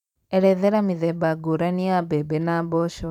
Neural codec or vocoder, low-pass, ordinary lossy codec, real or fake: vocoder, 44.1 kHz, 128 mel bands every 512 samples, BigVGAN v2; 19.8 kHz; none; fake